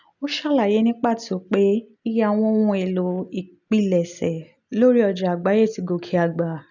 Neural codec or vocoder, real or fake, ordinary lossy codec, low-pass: none; real; none; 7.2 kHz